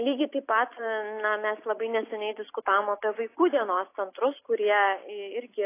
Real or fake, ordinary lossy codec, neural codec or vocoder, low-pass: real; AAC, 24 kbps; none; 3.6 kHz